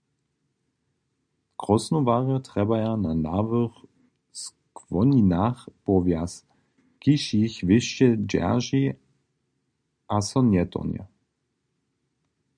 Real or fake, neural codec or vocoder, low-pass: real; none; 9.9 kHz